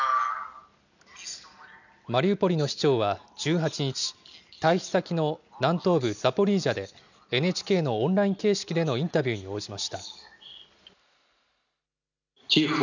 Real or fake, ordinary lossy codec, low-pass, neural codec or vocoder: real; none; 7.2 kHz; none